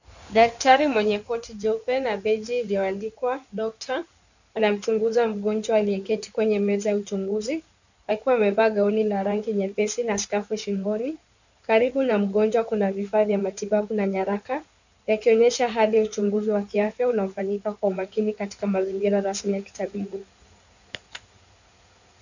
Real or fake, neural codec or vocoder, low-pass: fake; codec, 16 kHz in and 24 kHz out, 2.2 kbps, FireRedTTS-2 codec; 7.2 kHz